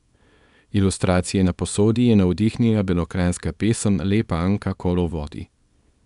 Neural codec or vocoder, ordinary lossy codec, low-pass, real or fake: codec, 24 kHz, 0.9 kbps, WavTokenizer, small release; none; 10.8 kHz; fake